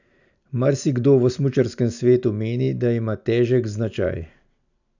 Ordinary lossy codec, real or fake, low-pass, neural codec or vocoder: none; real; 7.2 kHz; none